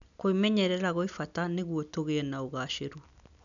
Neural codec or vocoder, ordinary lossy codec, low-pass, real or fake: none; none; 7.2 kHz; real